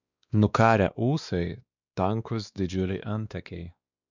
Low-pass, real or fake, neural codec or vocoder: 7.2 kHz; fake; codec, 16 kHz, 2 kbps, X-Codec, WavLM features, trained on Multilingual LibriSpeech